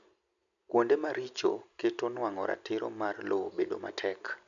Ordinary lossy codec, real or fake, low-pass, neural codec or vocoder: none; real; 7.2 kHz; none